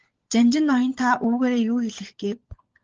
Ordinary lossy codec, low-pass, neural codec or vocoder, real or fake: Opus, 16 kbps; 7.2 kHz; codec, 16 kHz, 16 kbps, FunCodec, trained on LibriTTS, 50 frames a second; fake